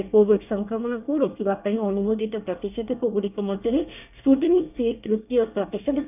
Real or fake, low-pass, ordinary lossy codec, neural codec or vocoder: fake; 3.6 kHz; none; codec, 24 kHz, 1 kbps, SNAC